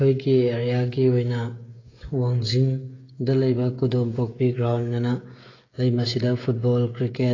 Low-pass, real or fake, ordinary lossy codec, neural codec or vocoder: 7.2 kHz; fake; AAC, 32 kbps; codec, 16 kHz, 16 kbps, FreqCodec, smaller model